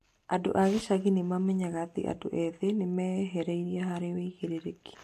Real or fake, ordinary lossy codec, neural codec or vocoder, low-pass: real; AAC, 64 kbps; none; 14.4 kHz